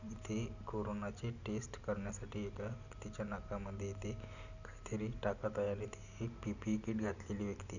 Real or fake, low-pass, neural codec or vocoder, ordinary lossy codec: real; 7.2 kHz; none; none